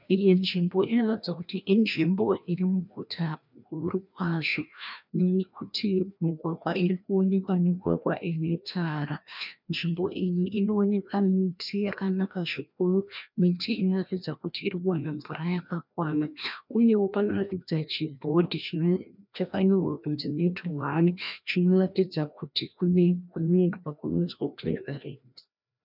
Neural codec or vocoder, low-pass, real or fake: codec, 16 kHz, 1 kbps, FreqCodec, larger model; 5.4 kHz; fake